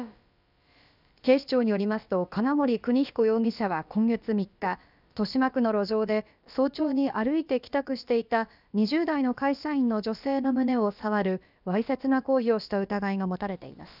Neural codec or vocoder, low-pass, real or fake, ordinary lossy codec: codec, 16 kHz, about 1 kbps, DyCAST, with the encoder's durations; 5.4 kHz; fake; none